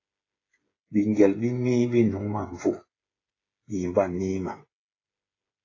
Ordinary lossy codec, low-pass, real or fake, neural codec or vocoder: AAC, 32 kbps; 7.2 kHz; fake; codec, 16 kHz, 8 kbps, FreqCodec, smaller model